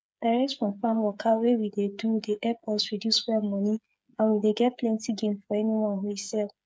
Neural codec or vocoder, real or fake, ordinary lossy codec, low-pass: codec, 16 kHz, 8 kbps, FreqCodec, smaller model; fake; none; none